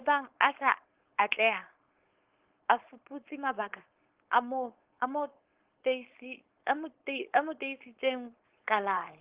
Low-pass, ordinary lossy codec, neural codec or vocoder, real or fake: 3.6 kHz; Opus, 16 kbps; codec, 16 kHz, 4.8 kbps, FACodec; fake